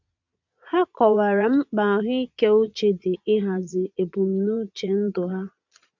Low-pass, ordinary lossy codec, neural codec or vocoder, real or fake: 7.2 kHz; none; vocoder, 22.05 kHz, 80 mel bands, Vocos; fake